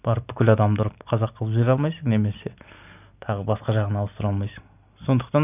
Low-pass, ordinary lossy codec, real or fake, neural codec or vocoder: 3.6 kHz; none; real; none